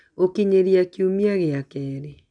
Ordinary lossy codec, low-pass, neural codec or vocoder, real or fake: none; 9.9 kHz; none; real